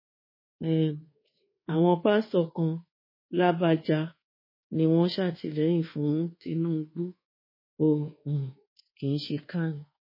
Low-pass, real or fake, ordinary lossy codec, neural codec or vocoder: 5.4 kHz; fake; MP3, 24 kbps; codec, 24 kHz, 1.2 kbps, DualCodec